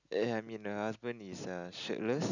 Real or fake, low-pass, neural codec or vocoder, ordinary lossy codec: real; 7.2 kHz; none; none